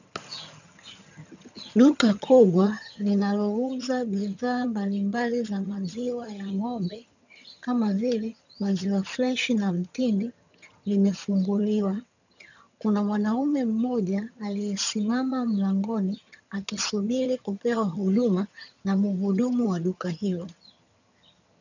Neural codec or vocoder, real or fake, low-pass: vocoder, 22.05 kHz, 80 mel bands, HiFi-GAN; fake; 7.2 kHz